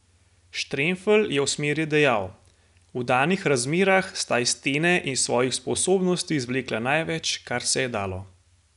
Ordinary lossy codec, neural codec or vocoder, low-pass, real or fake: none; none; 10.8 kHz; real